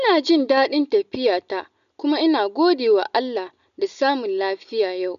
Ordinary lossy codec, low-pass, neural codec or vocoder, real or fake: none; 7.2 kHz; none; real